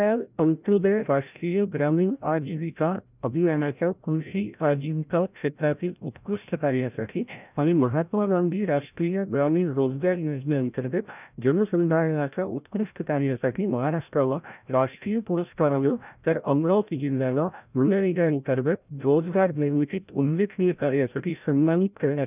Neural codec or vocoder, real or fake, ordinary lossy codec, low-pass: codec, 16 kHz, 0.5 kbps, FreqCodec, larger model; fake; none; 3.6 kHz